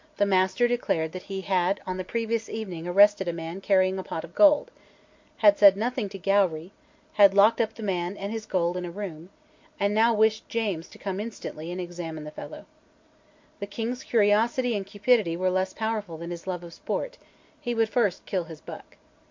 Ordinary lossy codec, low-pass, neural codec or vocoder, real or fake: MP3, 48 kbps; 7.2 kHz; none; real